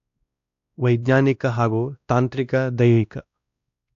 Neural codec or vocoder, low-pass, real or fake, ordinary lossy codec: codec, 16 kHz, 1 kbps, X-Codec, WavLM features, trained on Multilingual LibriSpeech; 7.2 kHz; fake; AAC, 64 kbps